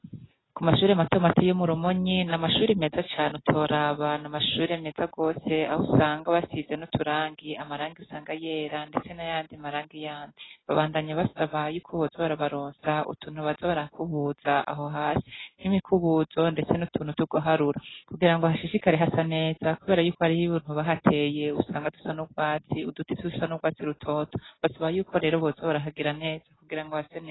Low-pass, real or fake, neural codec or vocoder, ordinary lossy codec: 7.2 kHz; real; none; AAC, 16 kbps